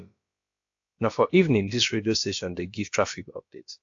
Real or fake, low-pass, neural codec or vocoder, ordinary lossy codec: fake; 7.2 kHz; codec, 16 kHz, about 1 kbps, DyCAST, with the encoder's durations; AAC, 48 kbps